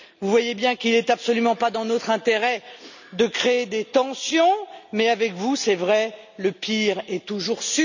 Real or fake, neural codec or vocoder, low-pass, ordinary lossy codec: real; none; 7.2 kHz; none